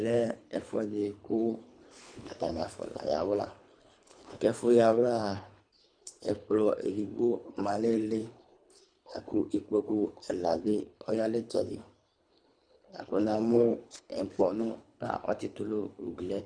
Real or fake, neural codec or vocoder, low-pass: fake; codec, 24 kHz, 3 kbps, HILCodec; 9.9 kHz